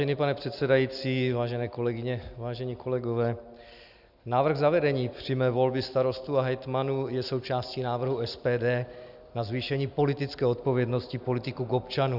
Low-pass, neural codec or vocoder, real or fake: 5.4 kHz; none; real